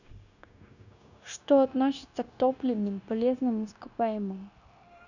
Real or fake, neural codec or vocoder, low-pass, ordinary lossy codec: fake; codec, 16 kHz, 0.9 kbps, LongCat-Audio-Codec; 7.2 kHz; none